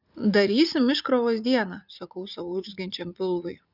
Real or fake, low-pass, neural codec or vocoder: fake; 5.4 kHz; vocoder, 44.1 kHz, 80 mel bands, Vocos